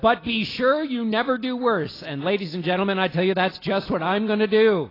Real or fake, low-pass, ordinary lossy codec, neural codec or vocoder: real; 5.4 kHz; AAC, 24 kbps; none